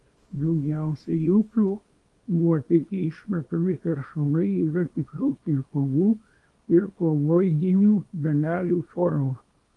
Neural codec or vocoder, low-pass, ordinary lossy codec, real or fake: codec, 24 kHz, 0.9 kbps, WavTokenizer, small release; 10.8 kHz; Opus, 32 kbps; fake